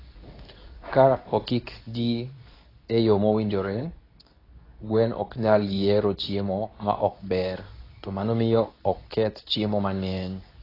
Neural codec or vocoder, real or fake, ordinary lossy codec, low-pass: codec, 24 kHz, 0.9 kbps, WavTokenizer, medium speech release version 2; fake; AAC, 24 kbps; 5.4 kHz